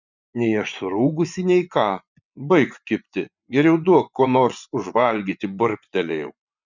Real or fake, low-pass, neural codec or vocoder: fake; 7.2 kHz; vocoder, 24 kHz, 100 mel bands, Vocos